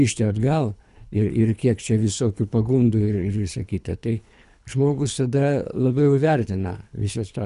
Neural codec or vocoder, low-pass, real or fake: codec, 24 kHz, 3 kbps, HILCodec; 10.8 kHz; fake